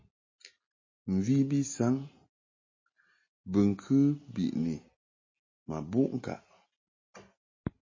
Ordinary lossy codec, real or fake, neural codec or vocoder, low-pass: MP3, 32 kbps; real; none; 7.2 kHz